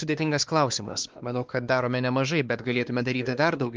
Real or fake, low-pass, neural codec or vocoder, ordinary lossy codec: fake; 7.2 kHz; codec, 16 kHz, 2 kbps, X-Codec, WavLM features, trained on Multilingual LibriSpeech; Opus, 32 kbps